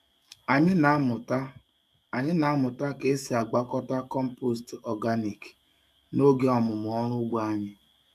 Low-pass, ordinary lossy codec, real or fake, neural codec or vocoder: 14.4 kHz; none; fake; codec, 44.1 kHz, 7.8 kbps, DAC